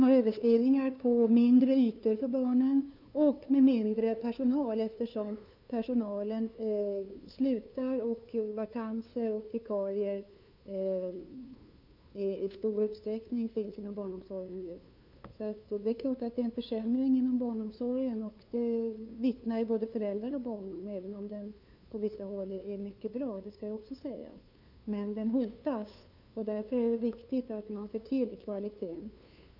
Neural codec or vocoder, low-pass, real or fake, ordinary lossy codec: codec, 16 kHz, 2 kbps, FunCodec, trained on LibriTTS, 25 frames a second; 5.4 kHz; fake; none